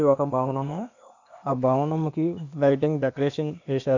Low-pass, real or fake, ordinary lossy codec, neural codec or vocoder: 7.2 kHz; fake; none; codec, 16 kHz, 0.8 kbps, ZipCodec